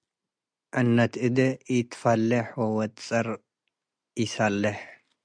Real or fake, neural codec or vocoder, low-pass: real; none; 9.9 kHz